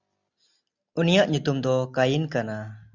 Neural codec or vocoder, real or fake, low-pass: none; real; 7.2 kHz